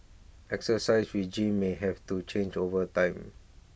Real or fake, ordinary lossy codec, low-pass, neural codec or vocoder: real; none; none; none